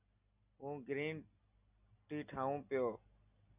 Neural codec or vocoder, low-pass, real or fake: none; 3.6 kHz; real